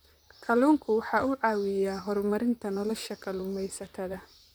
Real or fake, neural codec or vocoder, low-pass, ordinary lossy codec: fake; vocoder, 44.1 kHz, 128 mel bands, Pupu-Vocoder; none; none